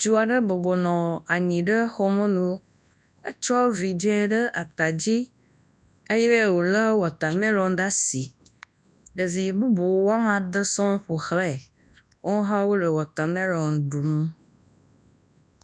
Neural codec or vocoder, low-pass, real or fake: codec, 24 kHz, 0.9 kbps, WavTokenizer, large speech release; 10.8 kHz; fake